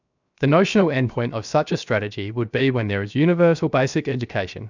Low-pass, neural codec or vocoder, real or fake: 7.2 kHz; codec, 16 kHz, 0.7 kbps, FocalCodec; fake